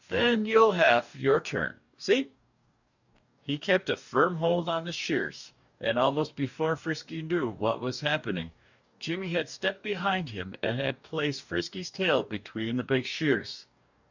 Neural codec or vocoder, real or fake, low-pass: codec, 44.1 kHz, 2.6 kbps, DAC; fake; 7.2 kHz